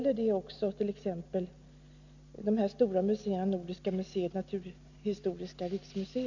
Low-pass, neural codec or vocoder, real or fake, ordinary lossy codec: 7.2 kHz; none; real; none